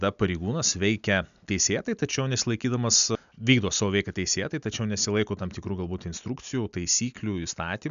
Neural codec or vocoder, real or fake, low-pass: none; real; 7.2 kHz